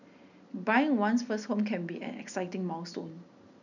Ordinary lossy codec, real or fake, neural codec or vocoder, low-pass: none; real; none; 7.2 kHz